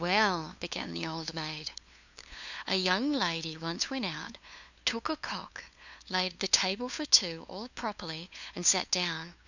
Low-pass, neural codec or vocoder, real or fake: 7.2 kHz; codec, 16 kHz, 2 kbps, FunCodec, trained on LibriTTS, 25 frames a second; fake